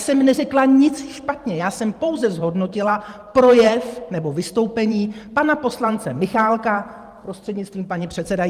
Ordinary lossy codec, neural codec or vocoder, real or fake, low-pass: Opus, 32 kbps; vocoder, 44.1 kHz, 128 mel bands every 512 samples, BigVGAN v2; fake; 14.4 kHz